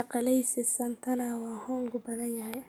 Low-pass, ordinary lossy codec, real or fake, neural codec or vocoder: none; none; fake; codec, 44.1 kHz, 7.8 kbps, DAC